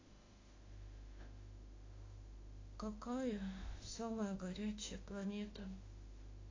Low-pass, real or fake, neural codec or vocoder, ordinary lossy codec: 7.2 kHz; fake; autoencoder, 48 kHz, 32 numbers a frame, DAC-VAE, trained on Japanese speech; AAC, 32 kbps